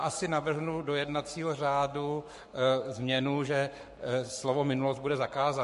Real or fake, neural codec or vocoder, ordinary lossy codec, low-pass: fake; codec, 44.1 kHz, 7.8 kbps, Pupu-Codec; MP3, 48 kbps; 14.4 kHz